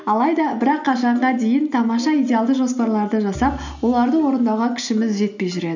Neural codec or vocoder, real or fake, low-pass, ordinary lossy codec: none; real; 7.2 kHz; none